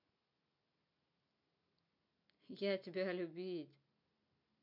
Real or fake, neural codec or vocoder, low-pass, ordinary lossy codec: real; none; 5.4 kHz; MP3, 48 kbps